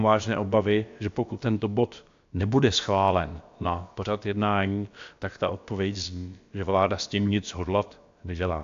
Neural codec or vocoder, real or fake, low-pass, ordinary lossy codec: codec, 16 kHz, about 1 kbps, DyCAST, with the encoder's durations; fake; 7.2 kHz; AAC, 64 kbps